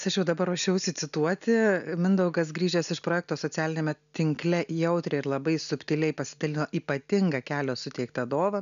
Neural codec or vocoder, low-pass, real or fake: none; 7.2 kHz; real